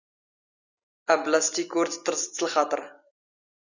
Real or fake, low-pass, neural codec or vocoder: real; 7.2 kHz; none